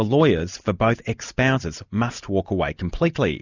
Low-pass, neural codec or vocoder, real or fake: 7.2 kHz; none; real